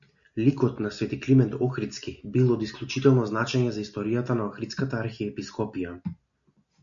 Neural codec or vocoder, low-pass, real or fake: none; 7.2 kHz; real